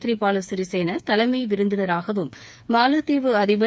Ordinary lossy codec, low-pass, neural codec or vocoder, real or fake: none; none; codec, 16 kHz, 4 kbps, FreqCodec, smaller model; fake